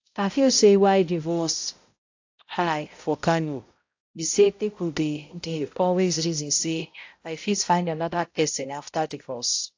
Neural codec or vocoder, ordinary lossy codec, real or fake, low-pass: codec, 16 kHz, 0.5 kbps, X-Codec, HuBERT features, trained on balanced general audio; AAC, 48 kbps; fake; 7.2 kHz